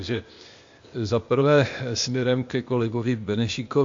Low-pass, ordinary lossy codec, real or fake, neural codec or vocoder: 7.2 kHz; MP3, 48 kbps; fake; codec, 16 kHz, 0.8 kbps, ZipCodec